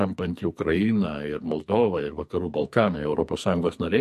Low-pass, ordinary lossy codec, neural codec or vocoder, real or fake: 14.4 kHz; MP3, 64 kbps; codec, 44.1 kHz, 2.6 kbps, SNAC; fake